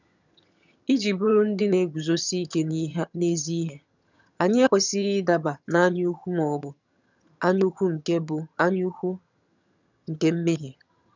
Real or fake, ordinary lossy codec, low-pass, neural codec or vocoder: fake; none; 7.2 kHz; vocoder, 22.05 kHz, 80 mel bands, HiFi-GAN